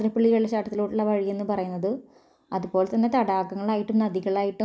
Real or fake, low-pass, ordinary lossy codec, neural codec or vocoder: real; none; none; none